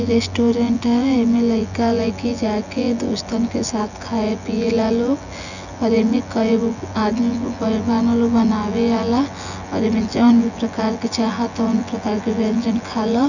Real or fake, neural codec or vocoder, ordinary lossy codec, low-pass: fake; vocoder, 24 kHz, 100 mel bands, Vocos; none; 7.2 kHz